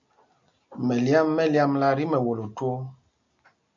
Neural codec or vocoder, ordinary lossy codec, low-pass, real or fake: none; MP3, 64 kbps; 7.2 kHz; real